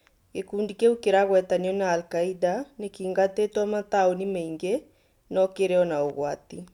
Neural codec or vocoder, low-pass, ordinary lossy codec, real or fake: none; 19.8 kHz; none; real